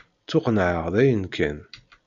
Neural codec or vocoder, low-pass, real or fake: none; 7.2 kHz; real